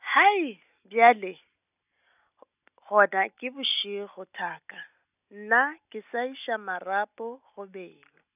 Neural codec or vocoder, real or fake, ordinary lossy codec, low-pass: none; real; none; 3.6 kHz